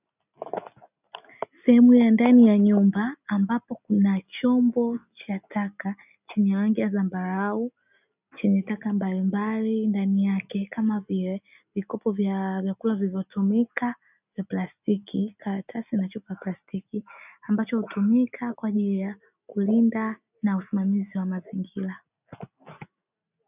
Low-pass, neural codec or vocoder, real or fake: 3.6 kHz; none; real